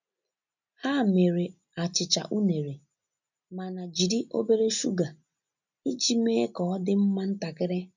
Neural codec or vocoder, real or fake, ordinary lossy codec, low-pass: none; real; none; 7.2 kHz